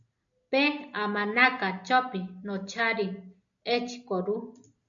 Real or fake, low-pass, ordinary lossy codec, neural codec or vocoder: real; 7.2 kHz; MP3, 64 kbps; none